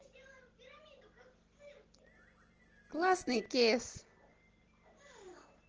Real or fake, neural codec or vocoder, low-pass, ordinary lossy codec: real; none; 7.2 kHz; Opus, 16 kbps